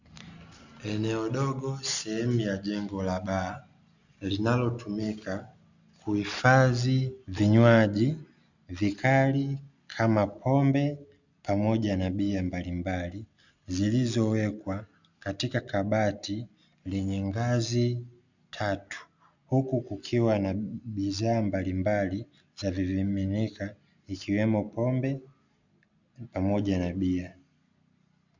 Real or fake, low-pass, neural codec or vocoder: real; 7.2 kHz; none